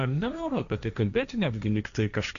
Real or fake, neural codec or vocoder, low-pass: fake; codec, 16 kHz, 1.1 kbps, Voila-Tokenizer; 7.2 kHz